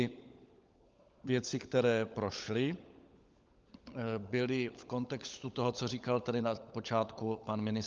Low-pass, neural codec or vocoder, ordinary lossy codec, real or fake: 7.2 kHz; codec, 16 kHz, 16 kbps, FunCodec, trained on LibriTTS, 50 frames a second; Opus, 24 kbps; fake